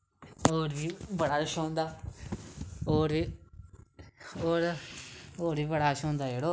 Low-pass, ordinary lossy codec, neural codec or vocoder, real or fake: none; none; none; real